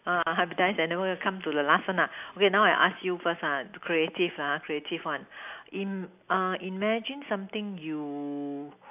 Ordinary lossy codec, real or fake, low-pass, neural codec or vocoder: AAC, 32 kbps; real; 3.6 kHz; none